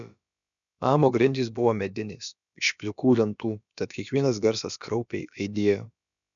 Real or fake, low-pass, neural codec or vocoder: fake; 7.2 kHz; codec, 16 kHz, about 1 kbps, DyCAST, with the encoder's durations